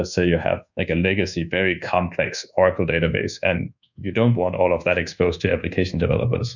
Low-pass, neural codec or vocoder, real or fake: 7.2 kHz; codec, 24 kHz, 1.2 kbps, DualCodec; fake